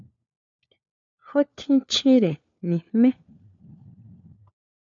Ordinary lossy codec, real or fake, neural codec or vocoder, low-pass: MP3, 64 kbps; fake; codec, 16 kHz, 4 kbps, FunCodec, trained on LibriTTS, 50 frames a second; 7.2 kHz